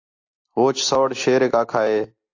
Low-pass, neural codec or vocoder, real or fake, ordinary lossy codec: 7.2 kHz; none; real; AAC, 48 kbps